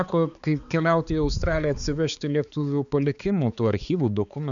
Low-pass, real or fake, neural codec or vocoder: 7.2 kHz; fake; codec, 16 kHz, 4 kbps, X-Codec, HuBERT features, trained on balanced general audio